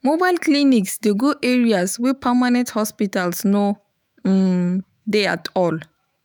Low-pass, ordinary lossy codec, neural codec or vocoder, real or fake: none; none; autoencoder, 48 kHz, 128 numbers a frame, DAC-VAE, trained on Japanese speech; fake